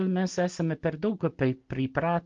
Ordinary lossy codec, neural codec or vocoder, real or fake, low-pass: Opus, 32 kbps; none; real; 7.2 kHz